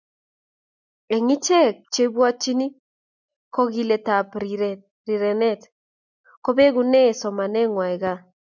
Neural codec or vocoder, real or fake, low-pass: none; real; 7.2 kHz